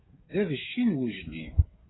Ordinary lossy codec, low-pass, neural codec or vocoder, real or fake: AAC, 16 kbps; 7.2 kHz; codec, 16 kHz, 8 kbps, FreqCodec, smaller model; fake